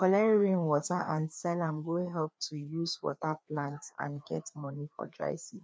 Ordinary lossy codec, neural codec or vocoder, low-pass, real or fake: none; codec, 16 kHz, 2 kbps, FreqCodec, larger model; none; fake